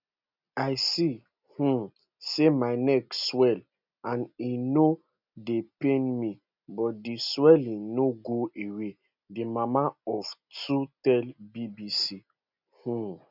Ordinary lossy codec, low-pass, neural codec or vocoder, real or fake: none; 5.4 kHz; none; real